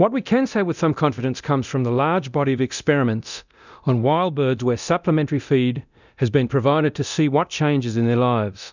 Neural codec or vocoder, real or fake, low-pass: codec, 24 kHz, 0.9 kbps, DualCodec; fake; 7.2 kHz